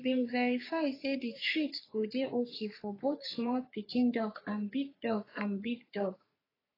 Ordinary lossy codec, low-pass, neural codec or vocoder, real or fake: AAC, 24 kbps; 5.4 kHz; codec, 44.1 kHz, 3.4 kbps, Pupu-Codec; fake